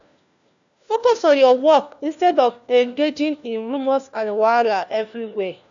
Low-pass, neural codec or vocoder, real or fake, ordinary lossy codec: 7.2 kHz; codec, 16 kHz, 1 kbps, FunCodec, trained on LibriTTS, 50 frames a second; fake; MP3, 96 kbps